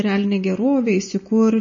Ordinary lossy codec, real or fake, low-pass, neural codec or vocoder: MP3, 32 kbps; real; 7.2 kHz; none